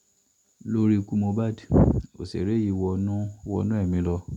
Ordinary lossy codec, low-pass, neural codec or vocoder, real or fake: none; 19.8 kHz; none; real